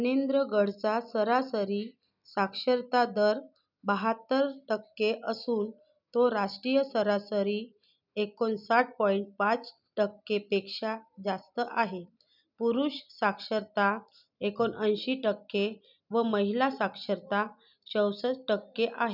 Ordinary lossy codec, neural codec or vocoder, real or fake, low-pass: none; none; real; 5.4 kHz